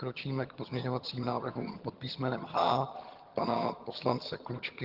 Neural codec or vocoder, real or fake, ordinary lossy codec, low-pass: vocoder, 22.05 kHz, 80 mel bands, HiFi-GAN; fake; Opus, 16 kbps; 5.4 kHz